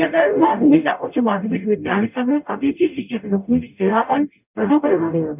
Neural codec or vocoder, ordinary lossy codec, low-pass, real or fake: codec, 44.1 kHz, 0.9 kbps, DAC; none; 3.6 kHz; fake